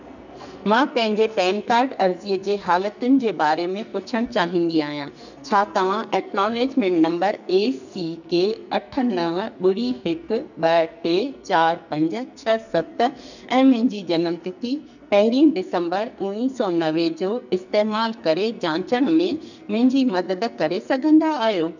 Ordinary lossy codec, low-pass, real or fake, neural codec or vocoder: none; 7.2 kHz; fake; codec, 44.1 kHz, 2.6 kbps, SNAC